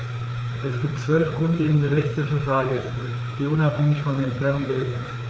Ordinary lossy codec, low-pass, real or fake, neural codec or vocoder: none; none; fake; codec, 16 kHz, 4 kbps, FreqCodec, larger model